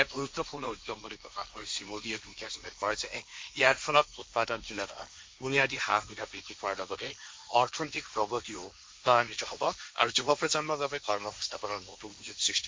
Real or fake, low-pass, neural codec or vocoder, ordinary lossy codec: fake; none; codec, 16 kHz, 1.1 kbps, Voila-Tokenizer; none